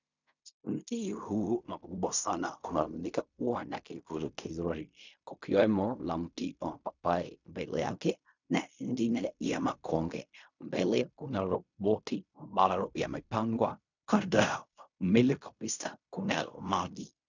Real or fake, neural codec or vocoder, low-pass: fake; codec, 16 kHz in and 24 kHz out, 0.4 kbps, LongCat-Audio-Codec, fine tuned four codebook decoder; 7.2 kHz